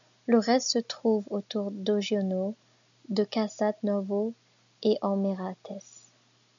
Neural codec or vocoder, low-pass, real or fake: none; 7.2 kHz; real